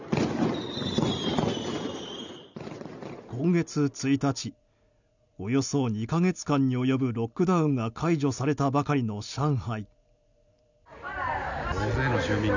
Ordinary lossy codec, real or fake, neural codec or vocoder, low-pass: none; real; none; 7.2 kHz